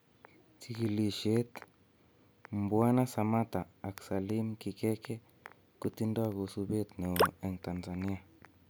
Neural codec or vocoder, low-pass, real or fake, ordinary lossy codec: none; none; real; none